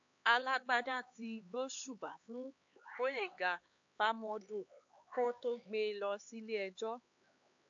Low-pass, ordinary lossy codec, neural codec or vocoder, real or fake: 7.2 kHz; AAC, 48 kbps; codec, 16 kHz, 4 kbps, X-Codec, HuBERT features, trained on LibriSpeech; fake